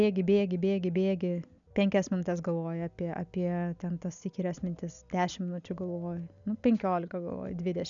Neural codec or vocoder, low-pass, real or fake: none; 7.2 kHz; real